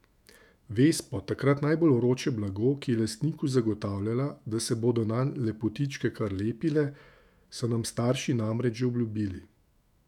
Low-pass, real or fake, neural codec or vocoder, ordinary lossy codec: 19.8 kHz; fake; autoencoder, 48 kHz, 128 numbers a frame, DAC-VAE, trained on Japanese speech; none